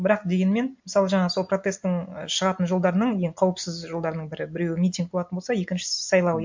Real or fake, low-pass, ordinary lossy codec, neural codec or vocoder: real; 7.2 kHz; MP3, 48 kbps; none